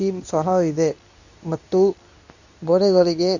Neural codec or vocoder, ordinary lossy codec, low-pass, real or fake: codec, 24 kHz, 0.9 kbps, WavTokenizer, medium speech release version 2; none; 7.2 kHz; fake